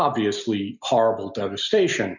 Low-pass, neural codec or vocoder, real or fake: 7.2 kHz; none; real